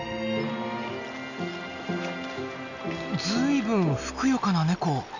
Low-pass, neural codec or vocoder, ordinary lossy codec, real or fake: 7.2 kHz; none; none; real